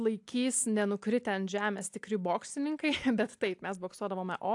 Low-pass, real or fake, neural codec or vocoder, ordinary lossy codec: 10.8 kHz; real; none; AAC, 64 kbps